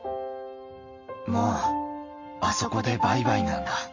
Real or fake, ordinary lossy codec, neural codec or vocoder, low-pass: real; MP3, 32 kbps; none; 7.2 kHz